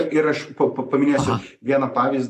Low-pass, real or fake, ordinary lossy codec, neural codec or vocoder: 14.4 kHz; real; AAC, 64 kbps; none